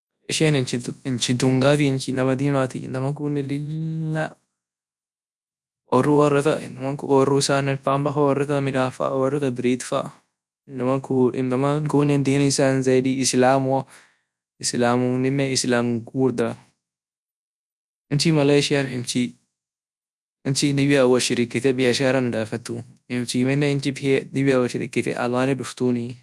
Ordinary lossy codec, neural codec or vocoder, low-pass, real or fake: none; codec, 24 kHz, 0.9 kbps, WavTokenizer, large speech release; none; fake